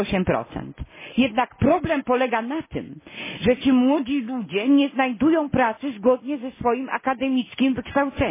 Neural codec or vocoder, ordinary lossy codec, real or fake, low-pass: none; MP3, 16 kbps; real; 3.6 kHz